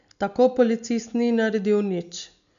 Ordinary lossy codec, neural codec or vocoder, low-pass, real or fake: none; none; 7.2 kHz; real